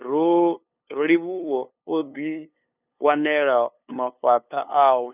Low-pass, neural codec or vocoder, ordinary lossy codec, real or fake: 3.6 kHz; codec, 16 kHz, 2 kbps, FunCodec, trained on LibriTTS, 25 frames a second; none; fake